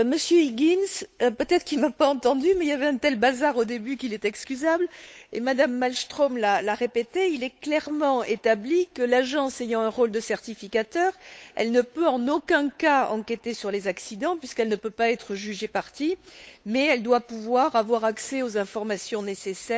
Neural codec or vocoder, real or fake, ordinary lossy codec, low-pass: codec, 16 kHz, 8 kbps, FunCodec, trained on Chinese and English, 25 frames a second; fake; none; none